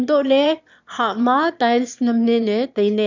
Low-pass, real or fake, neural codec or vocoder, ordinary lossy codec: 7.2 kHz; fake; autoencoder, 22.05 kHz, a latent of 192 numbers a frame, VITS, trained on one speaker; none